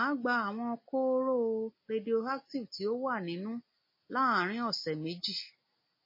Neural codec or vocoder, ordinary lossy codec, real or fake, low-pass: none; MP3, 24 kbps; real; 5.4 kHz